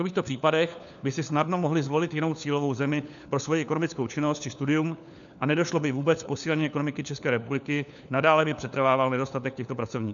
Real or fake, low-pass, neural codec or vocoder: fake; 7.2 kHz; codec, 16 kHz, 4 kbps, FunCodec, trained on LibriTTS, 50 frames a second